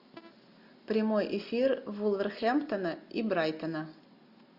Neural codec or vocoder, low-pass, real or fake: none; 5.4 kHz; real